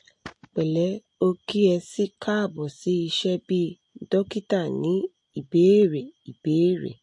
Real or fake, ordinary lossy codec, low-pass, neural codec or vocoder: real; MP3, 48 kbps; 10.8 kHz; none